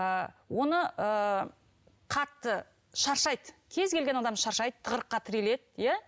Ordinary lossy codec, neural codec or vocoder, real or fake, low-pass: none; none; real; none